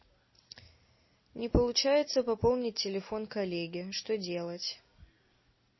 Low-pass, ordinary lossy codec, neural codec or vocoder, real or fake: 7.2 kHz; MP3, 24 kbps; none; real